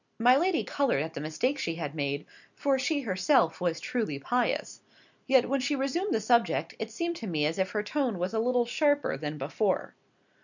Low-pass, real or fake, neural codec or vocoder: 7.2 kHz; real; none